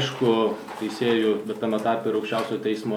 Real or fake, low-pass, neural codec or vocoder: real; 19.8 kHz; none